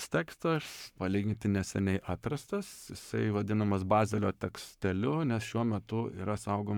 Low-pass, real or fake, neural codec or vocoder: 19.8 kHz; fake; codec, 44.1 kHz, 7.8 kbps, Pupu-Codec